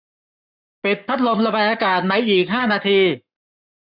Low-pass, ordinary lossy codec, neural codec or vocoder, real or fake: 5.4 kHz; none; codec, 44.1 kHz, 7.8 kbps, Pupu-Codec; fake